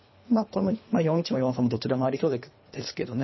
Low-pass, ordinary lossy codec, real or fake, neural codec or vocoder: 7.2 kHz; MP3, 24 kbps; fake; codec, 24 kHz, 3 kbps, HILCodec